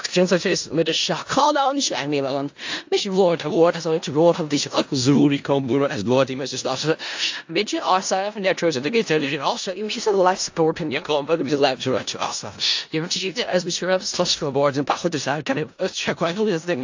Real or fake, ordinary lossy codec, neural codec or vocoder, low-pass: fake; AAC, 48 kbps; codec, 16 kHz in and 24 kHz out, 0.4 kbps, LongCat-Audio-Codec, four codebook decoder; 7.2 kHz